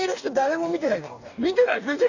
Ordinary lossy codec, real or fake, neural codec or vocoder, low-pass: none; fake; codec, 44.1 kHz, 2.6 kbps, DAC; 7.2 kHz